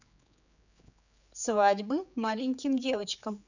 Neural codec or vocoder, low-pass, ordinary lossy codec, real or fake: codec, 16 kHz, 4 kbps, X-Codec, HuBERT features, trained on general audio; 7.2 kHz; none; fake